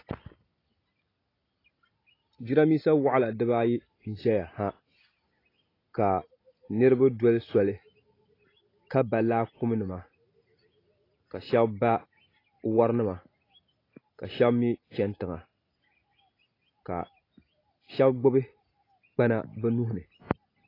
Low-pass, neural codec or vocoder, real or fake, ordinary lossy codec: 5.4 kHz; none; real; AAC, 24 kbps